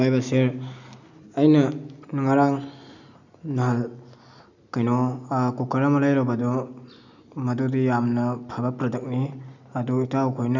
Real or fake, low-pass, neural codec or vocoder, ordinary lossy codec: real; 7.2 kHz; none; none